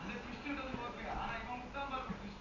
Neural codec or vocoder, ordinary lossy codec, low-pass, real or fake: none; none; 7.2 kHz; real